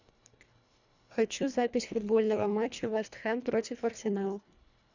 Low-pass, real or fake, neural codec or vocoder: 7.2 kHz; fake; codec, 24 kHz, 1.5 kbps, HILCodec